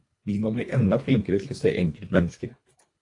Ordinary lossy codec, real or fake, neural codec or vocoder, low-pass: AAC, 48 kbps; fake; codec, 24 kHz, 1.5 kbps, HILCodec; 10.8 kHz